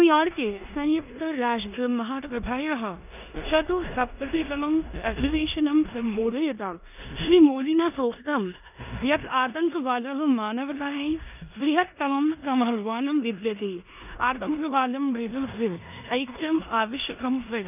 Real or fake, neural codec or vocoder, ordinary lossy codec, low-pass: fake; codec, 16 kHz in and 24 kHz out, 0.9 kbps, LongCat-Audio-Codec, four codebook decoder; none; 3.6 kHz